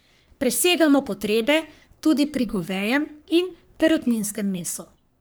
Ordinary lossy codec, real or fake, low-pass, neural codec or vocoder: none; fake; none; codec, 44.1 kHz, 3.4 kbps, Pupu-Codec